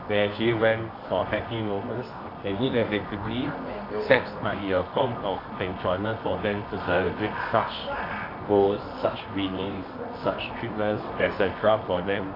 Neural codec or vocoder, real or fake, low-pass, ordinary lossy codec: codec, 24 kHz, 0.9 kbps, WavTokenizer, medium music audio release; fake; 5.4 kHz; AAC, 32 kbps